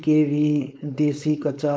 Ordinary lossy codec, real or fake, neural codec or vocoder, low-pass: none; fake; codec, 16 kHz, 4.8 kbps, FACodec; none